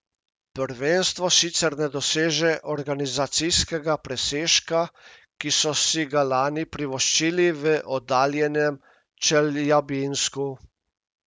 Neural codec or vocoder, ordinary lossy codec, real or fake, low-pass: none; none; real; none